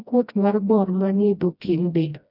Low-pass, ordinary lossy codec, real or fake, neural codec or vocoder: 5.4 kHz; none; fake; codec, 16 kHz, 1 kbps, FreqCodec, smaller model